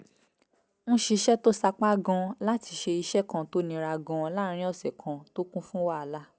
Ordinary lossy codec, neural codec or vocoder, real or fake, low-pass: none; none; real; none